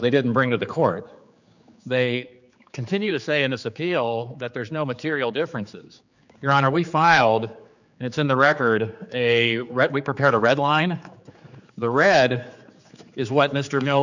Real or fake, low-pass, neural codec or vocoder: fake; 7.2 kHz; codec, 16 kHz, 4 kbps, X-Codec, HuBERT features, trained on general audio